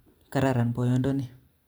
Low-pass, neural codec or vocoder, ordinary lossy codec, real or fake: none; vocoder, 44.1 kHz, 128 mel bands every 256 samples, BigVGAN v2; none; fake